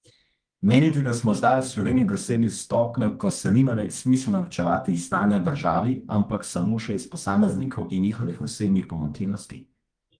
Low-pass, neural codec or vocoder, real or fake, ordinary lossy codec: 9.9 kHz; codec, 24 kHz, 0.9 kbps, WavTokenizer, medium music audio release; fake; Opus, 24 kbps